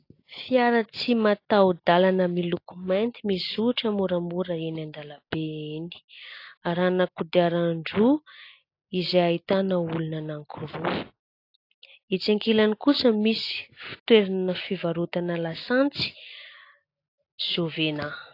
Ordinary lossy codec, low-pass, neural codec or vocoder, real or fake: AAC, 32 kbps; 5.4 kHz; none; real